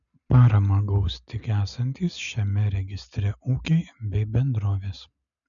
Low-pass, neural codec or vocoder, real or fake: 7.2 kHz; none; real